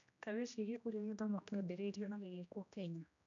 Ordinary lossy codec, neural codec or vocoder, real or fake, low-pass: none; codec, 16 kHz, 1 kbps, X-Codec, HuBERT features, trained on general audio; fake; 7.2 kHz